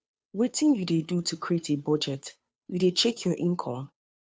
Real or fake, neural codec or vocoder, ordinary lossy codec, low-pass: fake; codec, 16 kHz, 2 kbps, FunCodec, trained on Chinese and English, 25 frames a second; none; none